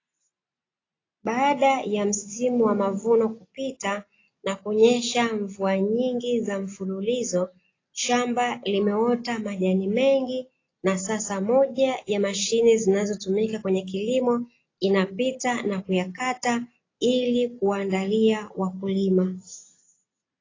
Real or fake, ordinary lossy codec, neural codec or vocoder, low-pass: real; AAC, 32 kbps; none; 7.2 kHz